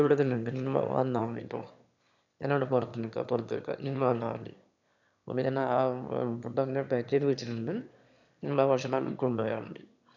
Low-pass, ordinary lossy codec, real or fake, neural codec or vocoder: 7.2 kHz; none; fake; autoencoder, 22.05 kHz, a latent of 192 numbers a frame, VITS, trained on one speaker